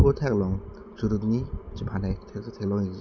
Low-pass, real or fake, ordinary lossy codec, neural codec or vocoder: 7.2 kHz; real; none; none